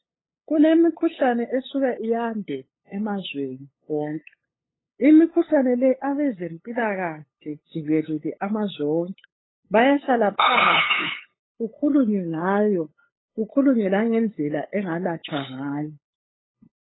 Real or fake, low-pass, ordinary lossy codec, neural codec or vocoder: fake; 7.2 kHz; AAC, 16 kbps; codec, 16 kHz, 8 kbps, FunCodec, trained on LibriTTS, 25 frames a second